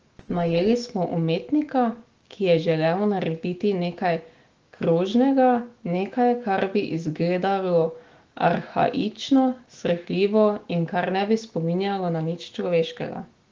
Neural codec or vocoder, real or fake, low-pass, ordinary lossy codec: autoencoder, 48 kHz, 128 numbers a frame, DAC-VAE, trained on Japanese speech; fake; 7.2 kHz; Opus, 16 kbps